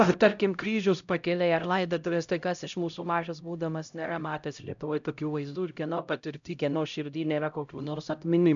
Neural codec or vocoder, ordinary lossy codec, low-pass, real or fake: codec, 16 kHz, 0.5 kbps, X-Codec, HuBERT features, trained on LibriSpeech; MP3, 96 kbps; 7.2 kHz; fake